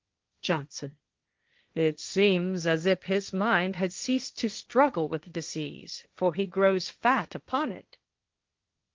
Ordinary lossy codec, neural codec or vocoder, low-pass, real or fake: Opus, 32 kbps; codec, 16 kHz, 1.1 kbps, Voila-Tokenizer; 7.2 kHz; fake